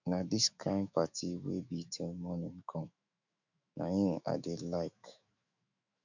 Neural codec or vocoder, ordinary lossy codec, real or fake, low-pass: autoencoder, 48 kHz, 128 numbers a frame, DAC-VAE, trained on Japanese speech; none; fake; 7.2 kHz